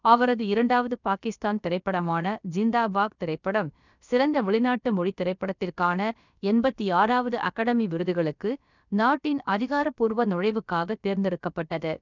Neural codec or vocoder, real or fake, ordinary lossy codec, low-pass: codec, 16 kHz, about 1 kbps, DyCAST, with the encoder's durations; fake; none; 7.2 kHz